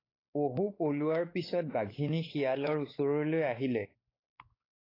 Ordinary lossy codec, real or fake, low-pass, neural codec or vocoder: AAC, 24 kbps; fake; 5.4 kHz; codec, 16 kHz, 4 kbps, X-Codec, WavLM features, trained on Multilingual LibriSpeech